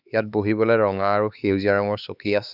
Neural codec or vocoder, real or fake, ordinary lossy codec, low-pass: codec, 16 kHz, 4 kbps, X-Codec, HuBERT features, trained on LibriSpeech; fake; none; 5.4 kHz